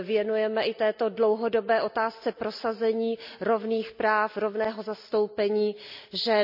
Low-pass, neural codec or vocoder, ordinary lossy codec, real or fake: 5.4 kHz; none; none; real